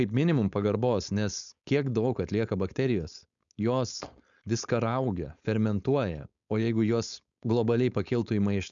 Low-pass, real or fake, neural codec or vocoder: 7.2 kHz; fake; codec, 16 kHz, 4.8 kbps, FACodec